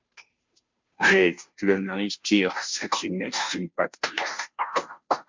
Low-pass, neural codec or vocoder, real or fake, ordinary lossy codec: 7.2 kHz; codec, 16 kHz, 0.5 kbps, FunCodec, trained on Chinese and English, 25 frames a second; fake; MP3, 64 kbps